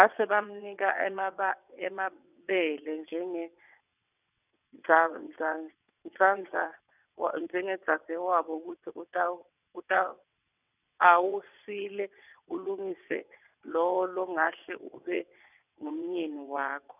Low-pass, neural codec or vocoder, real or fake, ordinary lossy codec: 3.6 kHz; vocoder, 22.05 kHz, 80 mel bands, WaveNeXt; fake; none